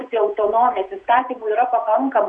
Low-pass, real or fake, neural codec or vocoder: 9.9 kHz; fake; vocoder, 48 kHz, 128 mel bands, Vocos